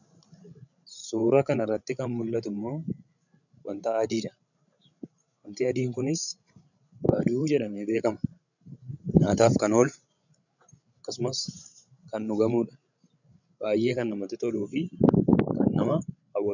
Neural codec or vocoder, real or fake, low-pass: codec, 16 kHz, 16 kbps, FreqCodec, larger model; fake; 7.2 kHz